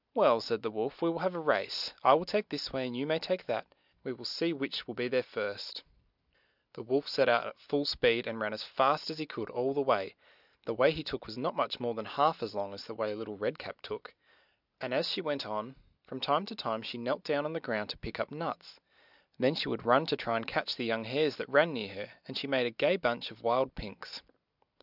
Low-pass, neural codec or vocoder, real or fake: 5.4 kHz; none; real